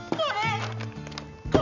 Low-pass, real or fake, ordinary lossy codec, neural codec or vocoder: 7.2 kHz; real; none; none